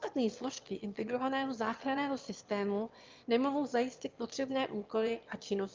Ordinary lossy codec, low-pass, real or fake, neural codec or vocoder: Opus, 24 kbps; 7.2 kHz; fake; autoencoder, 22.05 kHz, a latent of 192 numbers a frame, VITS, trained on one speaker